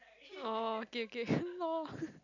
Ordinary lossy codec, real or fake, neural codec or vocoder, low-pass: none; real; none; 7.2 kHz